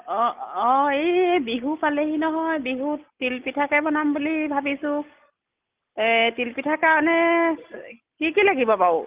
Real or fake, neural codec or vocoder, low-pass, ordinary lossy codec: real; none; 3.6 kHz; Opus, 32 kbps